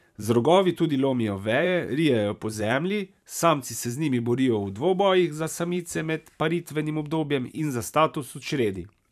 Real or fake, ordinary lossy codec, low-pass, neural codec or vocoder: fake; none; 14.4 kHz; vocoder, 44.1 kHz, 128 mel bands every 512 samples, BigVGAN v2